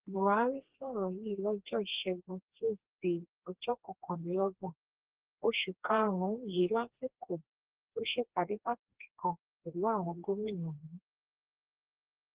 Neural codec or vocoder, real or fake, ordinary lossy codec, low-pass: codec, 16 kHz, 2 kbps, FreqCodec, smaller model; fake; Opus, 16 kbps; 3.6 kHz